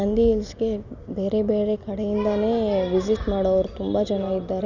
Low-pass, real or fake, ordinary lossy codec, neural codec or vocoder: 7.2 kHz; real; none; none